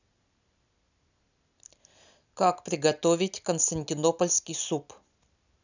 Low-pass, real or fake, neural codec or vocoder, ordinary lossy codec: 7.2 kHz; real; none; none